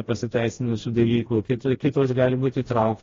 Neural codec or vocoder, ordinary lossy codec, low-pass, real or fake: codec, 16 kHz, 1 kbps, FreqCodec, smaller model; AAC, 32 kbps; 7.2 kHz; fake